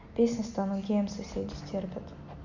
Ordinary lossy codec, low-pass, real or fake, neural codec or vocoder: none; 7.2 kHz; real; none